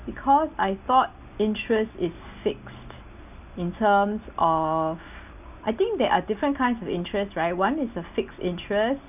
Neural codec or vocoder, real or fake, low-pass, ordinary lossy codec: none; real; 3.6 kHz; none